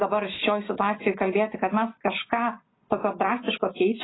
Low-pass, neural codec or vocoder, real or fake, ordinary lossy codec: 7.2 kHz; none; real; AAC, 16 kbps